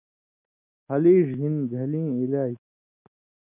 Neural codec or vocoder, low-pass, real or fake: none; 3.6 kHz; real